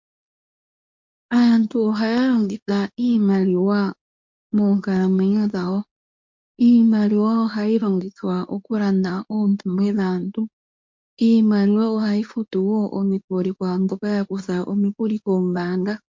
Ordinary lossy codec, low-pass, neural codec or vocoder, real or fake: MP3, 48 kbps; 7.2 kHz; codec, 24 kHz, 0.9 kbps, WavTokenizer, medium speech release version 2; fake